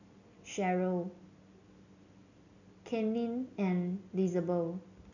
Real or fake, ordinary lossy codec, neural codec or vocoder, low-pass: real; none; none; 7.2 kHz